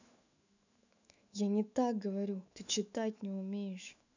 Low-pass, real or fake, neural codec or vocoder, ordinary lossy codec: 7.2 kHz; fake; autoencoder, 48 kHz, 128 numbers a frame, DAC-VAE, trained on Japanese speech; none